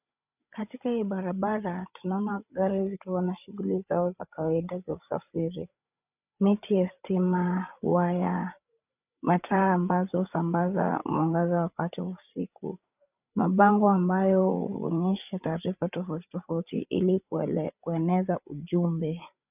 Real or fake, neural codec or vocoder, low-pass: fake; codec, 16 kHz, 8 kbps, FreqCodec, larger model; 3.6 kHz